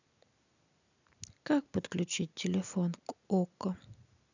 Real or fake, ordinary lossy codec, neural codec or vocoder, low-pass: real; none; none; 7.2 kHz